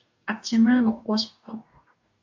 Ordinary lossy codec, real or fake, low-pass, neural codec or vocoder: AAC, 48 kbps; fake; 7.2 kHz; codec, 44.1 kHz, 2.6 kbps, DAC